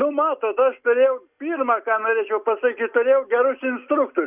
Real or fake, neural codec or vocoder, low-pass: real; none; 3.6 kHz